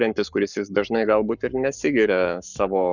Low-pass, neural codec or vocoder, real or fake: 7.2 kHz; codec, 44.1 kHz, 7.8 kbps, Pupu-Codec; fake